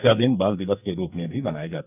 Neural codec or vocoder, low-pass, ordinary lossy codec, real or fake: codec, 44.1 kHz, 2.6 kbps, SNAC; 3.6 kHz; none; fake